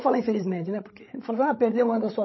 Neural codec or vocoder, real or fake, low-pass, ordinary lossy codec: codec, 16 kHz, 16 kbps, FreqCodec, larger model; fake; 7.2 kHz; MP3, 24 kbps